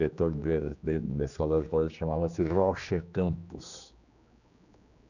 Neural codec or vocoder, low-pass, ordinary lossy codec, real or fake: codec, 16 kHz, 2 kbps, X-Codec, HuBERT features, trained on general audio; 7.2 kHz; none; fake